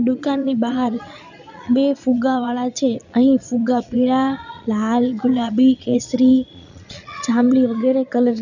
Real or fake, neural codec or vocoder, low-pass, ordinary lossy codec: fake; vocoder, 22.05 kHz, 80 mel bands, Vocos; 7.2 kHz; none